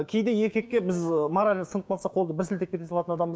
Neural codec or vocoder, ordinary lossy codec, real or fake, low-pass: codec, 16 kHz, 6 kbps, DAC; none; fake; none